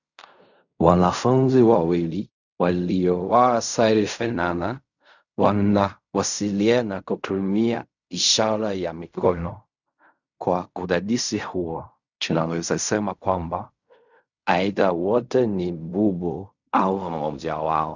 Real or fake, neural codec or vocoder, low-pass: fake; codec, 16 kHz in and 24 kHz out, 0.4 kbps, LongCat-Audio-Codec, fine tuned four codebook decoder; 7.2 kHz